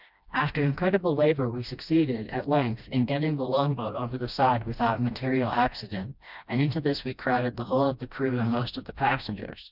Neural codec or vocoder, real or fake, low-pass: codec, 16 kHz, 1 kbps, FreqCodec, smaller model; fake; 5.4 kHz